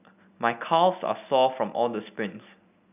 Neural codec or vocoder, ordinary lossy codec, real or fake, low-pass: none; none; real; 3.6 kHz